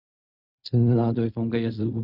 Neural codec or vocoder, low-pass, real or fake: codec, 16 kHz in and 24 kHz out, 0.4 kbps, LongCat-Audio-Codec, fine tuned four codebook decoder; 5.4 kHz; fake